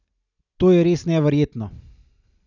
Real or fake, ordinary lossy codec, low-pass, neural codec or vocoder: real; none; 7.2 kHz; none